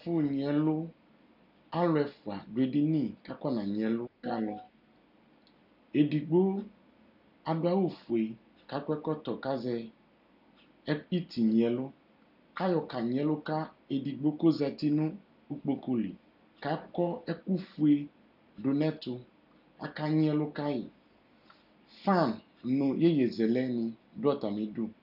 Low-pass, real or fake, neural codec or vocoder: 5.4 kHz; real; none